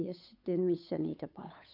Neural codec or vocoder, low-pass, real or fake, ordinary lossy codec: codec, 16 kHz, 8 kbps, FunCodec, trained on Chinese and English, 25 frames a second; 5.4 kHz; fake; AAC, 32 kbps